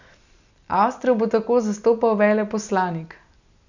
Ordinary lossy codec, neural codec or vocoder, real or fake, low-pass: none; none; real; 7.2 kHz